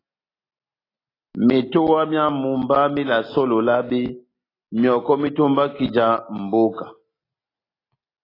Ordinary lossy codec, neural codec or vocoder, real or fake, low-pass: AAC, 24 kbps; none; real; 5.4 kHz